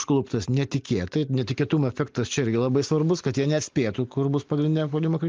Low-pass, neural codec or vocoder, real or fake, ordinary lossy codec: 7.2 kHz; none; real; Opus, 32 kbps